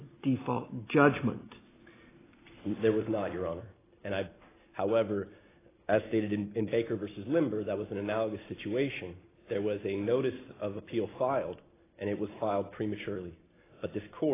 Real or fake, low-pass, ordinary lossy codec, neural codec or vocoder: real; 3.6 kHz; AAC, 16 kbps; none